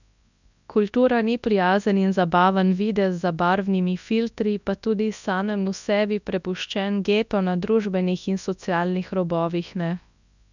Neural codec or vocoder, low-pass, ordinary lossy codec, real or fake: codec, 24 kHz, 0.9 kbps, WavTokenizer, large speech release; 7.2 kHz; none; fake